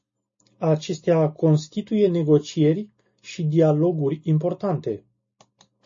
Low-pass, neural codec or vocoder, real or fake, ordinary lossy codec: 7.2 kHz; none; real; MP3, 32 kbps